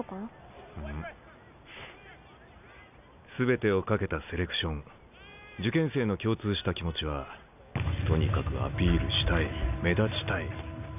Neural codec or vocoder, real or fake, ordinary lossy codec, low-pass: none; real; none; 3.6 kHz